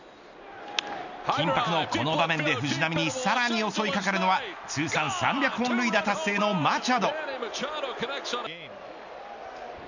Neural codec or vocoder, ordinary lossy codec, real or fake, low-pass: none; none; real; 7.2 kHz